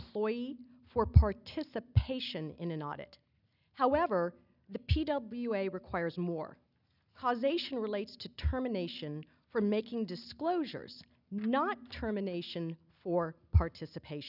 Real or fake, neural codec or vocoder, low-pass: real; none; 5.4 kHz